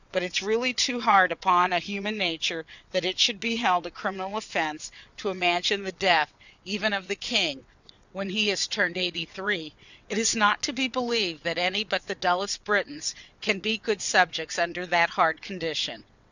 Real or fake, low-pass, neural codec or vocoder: fake; 7.2 kHz; vocoder, 22.05 kHz, 80 mel bands, WaveNeXt